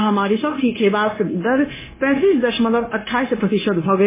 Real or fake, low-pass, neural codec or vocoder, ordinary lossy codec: fake; 3.6 kHz; codec, 16 kHz, 0.9 kbps, LongCat-Audio-Codec; MP3, 16 kbps